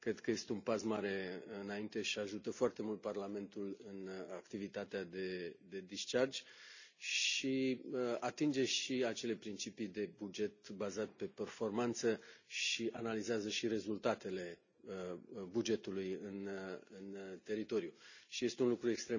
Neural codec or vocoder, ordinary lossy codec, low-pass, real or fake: none; none; 7.2 kHz; real